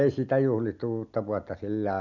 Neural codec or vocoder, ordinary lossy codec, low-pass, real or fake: none; none; 7.2 kHz; real